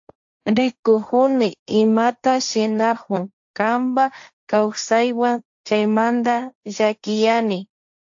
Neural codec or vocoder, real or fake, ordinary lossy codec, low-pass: codec, 16 kHz, 1.1 kbps, Voila-Tokenizer; fake; AAC, 64 kbps; 7.2 kHz